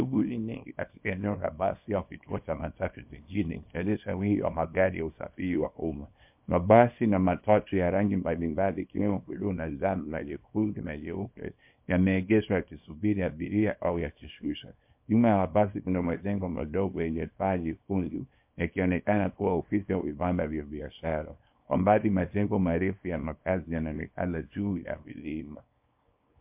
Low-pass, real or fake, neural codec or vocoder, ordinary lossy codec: 3.6 kHz; fake; codec, 24 kHz, 0.9 kbps, WavTokenizer, small release; MP3, 32 kbps